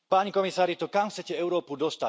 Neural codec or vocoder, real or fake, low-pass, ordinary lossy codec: none; real; none; none